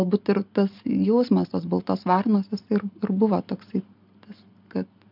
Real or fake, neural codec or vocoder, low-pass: real; none; 5.4 kHz